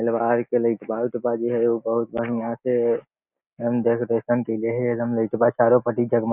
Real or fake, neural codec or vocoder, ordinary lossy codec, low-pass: real; none; none; 3.6 kHz